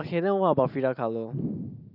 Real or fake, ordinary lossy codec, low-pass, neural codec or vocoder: real; none; 5.4 kHz; none